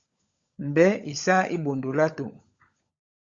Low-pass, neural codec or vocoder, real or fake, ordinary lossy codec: 7.2 kHz; codec, 16 kHz, 16 kbps, FunCodec, trained on LibriTTS, 50 frames a second; fake; Opus, 64 kbps